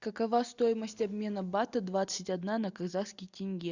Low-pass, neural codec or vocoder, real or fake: 7.2 kHz; none; real